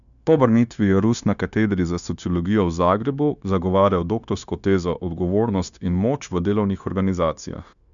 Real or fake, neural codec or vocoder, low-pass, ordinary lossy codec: fake; codec, 16 kHz, 0.9 kbps, LongCat-Audio-Codec; 7.2 kHz; none